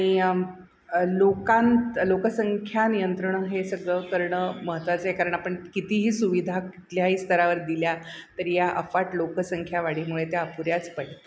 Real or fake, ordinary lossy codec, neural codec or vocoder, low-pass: real; none; none; none